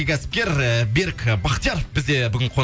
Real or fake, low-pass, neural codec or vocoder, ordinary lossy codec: real; none; none; none